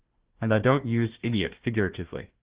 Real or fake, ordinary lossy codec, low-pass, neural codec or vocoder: fake; Opus, 16 kbps; 3.6 kHz; codec, 16 kHz, 1 kbps, FunCodec, trained on Chinese and English, 50 frames a second